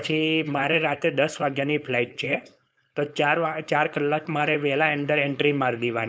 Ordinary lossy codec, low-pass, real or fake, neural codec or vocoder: none; none; fake; codec, 16 kHz, 4.8 kbps, FACodec